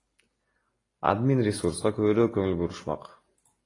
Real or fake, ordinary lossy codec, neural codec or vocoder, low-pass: real; AAC, 32 kbps; none; 10.8 kHz